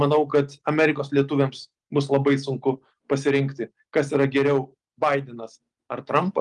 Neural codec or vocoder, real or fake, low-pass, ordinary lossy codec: none; real; 10.8 kHz; Opus, 32 kbps